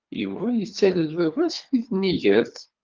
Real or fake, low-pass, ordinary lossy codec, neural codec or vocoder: fake; 7.2 kHz; Opus, 24 kbps; codec, 24 kHz, 3 kbps, HILCodec